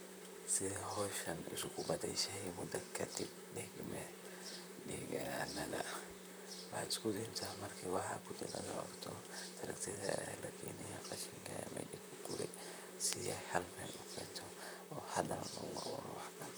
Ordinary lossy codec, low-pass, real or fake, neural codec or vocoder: none; none; fake; vocoder, 44.1 kHz, 128 mel bands, Pupu-Vocoder